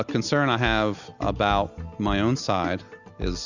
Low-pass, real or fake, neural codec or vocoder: 7.2 kHz; real; none